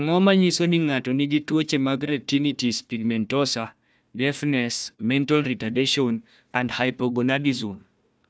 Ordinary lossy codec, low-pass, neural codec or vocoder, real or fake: none; none; codec, 16 kHz, 1 kbps, FunCodec, trained on Chinese and English, 50 frames a second; fake